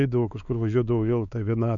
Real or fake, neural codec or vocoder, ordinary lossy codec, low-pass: fake; codec, 16 kHz, 4 kbps, X-Codec, WavLM features, trained on Multilingual LibriSpeech; MP3, 96 kbps; 7.2 kHz